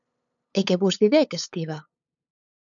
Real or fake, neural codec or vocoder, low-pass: fake; codec, 16 kHz, 8 kbps, FunCodec, trained on LibriTTS, 25 frames a second; 7.2 kHz